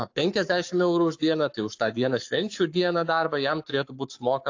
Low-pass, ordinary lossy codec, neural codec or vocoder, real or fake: 7.2 kHz; AAC, 48 kbps; codec, 44.1 kHz, 7.8 kbps, Pupu-Codec; fake